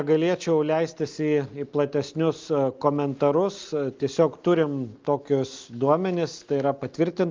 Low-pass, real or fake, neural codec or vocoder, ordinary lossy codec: 7.2 kHz; real; none; Opus, 24 kbps